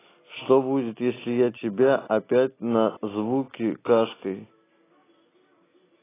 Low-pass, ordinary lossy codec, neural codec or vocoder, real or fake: 3.6 kHz; AAC, 16 kbps; none; real